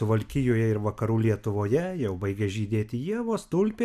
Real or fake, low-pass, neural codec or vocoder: real; 14.4 kHz; none